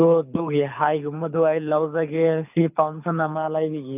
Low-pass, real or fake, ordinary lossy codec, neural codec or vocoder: 3.6 kHz; fake; none; codec, 24 kHz, 6 kbps, HILCodec